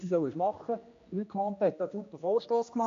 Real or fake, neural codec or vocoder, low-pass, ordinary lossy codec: fake; codec, 16 kHz, 1 kbps, X-Codec, HuBERT features, trained on general audio; 7.2 kHz; MP3, 48 kbps